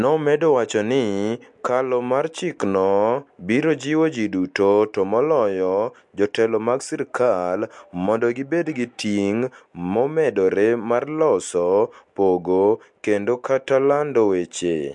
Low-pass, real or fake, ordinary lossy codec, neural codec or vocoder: 10.8 kHz; real; MP3, 64 kbps; none